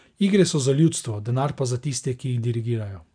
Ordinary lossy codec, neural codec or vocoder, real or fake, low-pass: none; none; real; 9.9 kHz